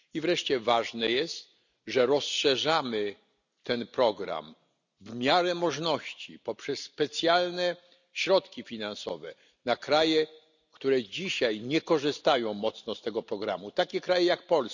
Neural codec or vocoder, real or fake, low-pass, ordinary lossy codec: none; real; 7.2 kHz; none